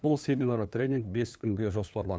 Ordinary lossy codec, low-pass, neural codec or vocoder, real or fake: none; none; codec, 16 kHz, 2 kbps, FunCodec, trained on LibriTTS, 25 frames a second; fake